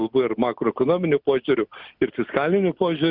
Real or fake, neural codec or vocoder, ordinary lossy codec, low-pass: real; none; Opus, 64 kbps; 5.4 kHz